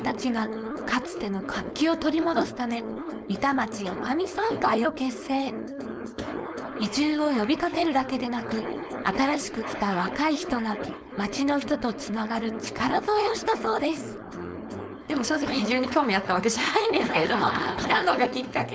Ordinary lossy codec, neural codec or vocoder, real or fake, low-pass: none; codec, 16 kHz, 4.8 kbps, FACodec; fake; none